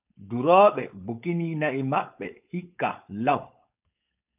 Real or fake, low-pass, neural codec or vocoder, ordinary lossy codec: fake; 3.6 kHz; codec, 16 kHz, 4.8 kbps, FACodec; AAC, 32 kbps